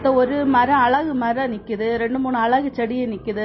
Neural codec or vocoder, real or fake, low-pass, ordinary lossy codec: none; real; 7.2 kHz; MP3, 24 kbps